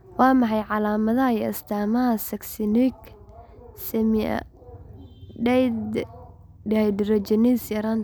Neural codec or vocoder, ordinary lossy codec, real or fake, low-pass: none; none; real; none